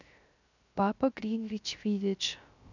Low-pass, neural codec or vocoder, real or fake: 7.2 kHz; codec, 16 kHz, 0.3 kbps, FocalCodec; fake